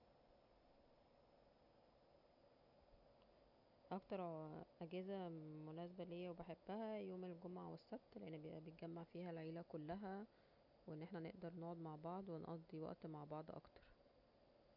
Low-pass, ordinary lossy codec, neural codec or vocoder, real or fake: 5.4 kHz; none; none; real